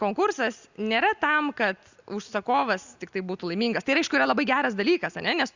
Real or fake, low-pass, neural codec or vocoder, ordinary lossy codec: real; 7.2 kHz; none; Opus, 64 kbps